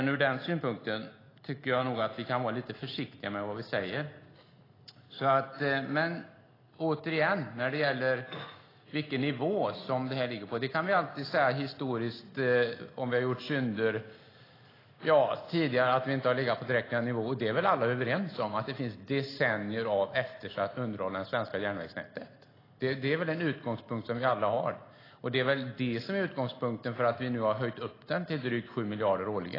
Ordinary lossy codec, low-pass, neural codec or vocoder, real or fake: AAC, 24 kbps; 5.4 kHz; none; real